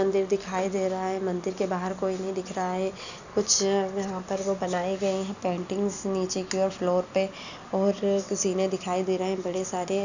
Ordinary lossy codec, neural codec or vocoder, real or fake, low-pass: none; none; real; 7.2 kHz